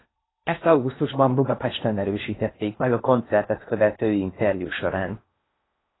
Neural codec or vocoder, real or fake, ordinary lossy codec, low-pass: codec, 16 kHz in and 24 kHz out, 0.8 kbps, FocalCodec, streaming, 65536 codes; fake; AAC, 16 kbps; 7.2 kHz